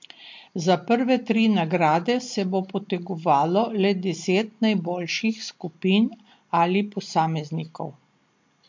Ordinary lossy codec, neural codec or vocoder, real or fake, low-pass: MP3, 48 kbps; none; real; 7.2 kHz